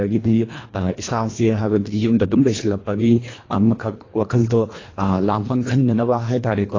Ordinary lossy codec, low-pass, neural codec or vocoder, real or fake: AAC, 32 kbps; 7.2 kHz; codec, 24 kHz, 1.5 kbps, HILCodec; fake